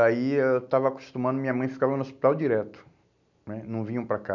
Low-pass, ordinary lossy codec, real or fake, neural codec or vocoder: 7.2 kHz; none; real; none